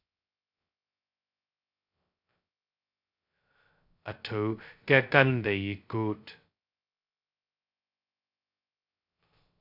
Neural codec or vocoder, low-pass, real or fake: codec, 16 kHz, 0.2 kbps, FocalCodec; 5.4 kHz; fake